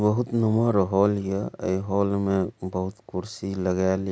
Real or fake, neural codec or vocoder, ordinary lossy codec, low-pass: real; none; none; none